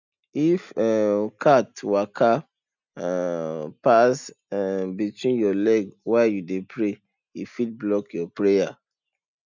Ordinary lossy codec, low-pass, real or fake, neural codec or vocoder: none; 7.2 kHz; real; none